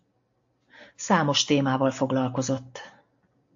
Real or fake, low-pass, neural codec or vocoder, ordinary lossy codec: real; 7.2 kHz; none; AAC, 64 kbps